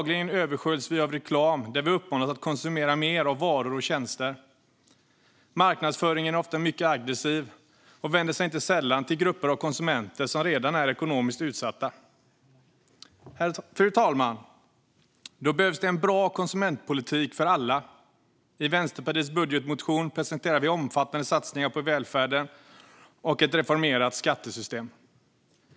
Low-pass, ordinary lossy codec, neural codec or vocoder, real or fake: none; none; none; real